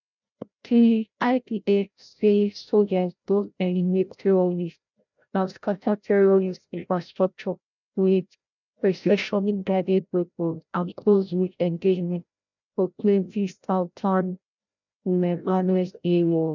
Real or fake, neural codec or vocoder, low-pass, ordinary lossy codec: fake; codec, 16 kHz, 0.5 kbps, FreqCodec, larger model; 7.2 kHz; none